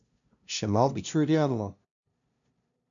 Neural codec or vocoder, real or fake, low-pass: codec, 16 kHz, 0.5 kbps, FunCodec, trained on LibriTTS, 25 frames a second; fake; 7.2 kHz